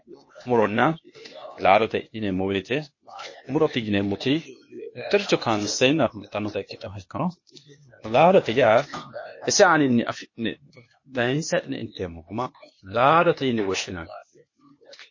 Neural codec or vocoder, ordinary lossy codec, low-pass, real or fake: codec, 16 kHz, 0.8 kbps, ZipCodec; MP3, 32 kbps; 7.2 kHz; fake